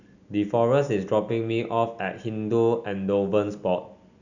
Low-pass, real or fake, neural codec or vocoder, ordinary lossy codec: 7.2 kHz; real; none; none